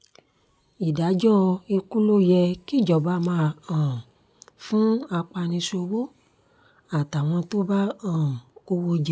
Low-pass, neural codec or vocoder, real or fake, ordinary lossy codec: none; none; real; none